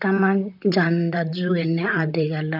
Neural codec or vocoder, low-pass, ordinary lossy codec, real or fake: vocoder, 44.1 kHz, 128 mel bands, Pupu-Vocoder; 5.4 kHz; none; fake